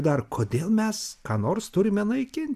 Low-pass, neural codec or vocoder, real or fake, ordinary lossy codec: 14.4 kHz; none; real; MP3, 96 kbps